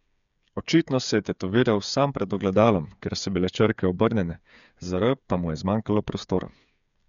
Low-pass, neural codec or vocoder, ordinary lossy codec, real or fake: 7.2 kHz; codec, 16 kHz, 8 kbps, FreqCodec, smaller model; none; fake